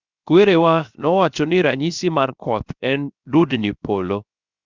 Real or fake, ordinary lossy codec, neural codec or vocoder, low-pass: fake; Opus, 64 kbps; codec, 16 kHz, 0.7 kbps, FocalCodec; 7.2 kHz